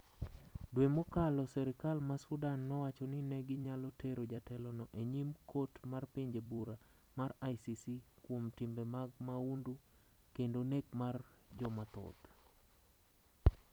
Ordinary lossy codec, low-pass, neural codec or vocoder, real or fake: none; none; vocoder, 44.1 kHz, 128 mel bands every 256 samples, BigVGAN v2; fake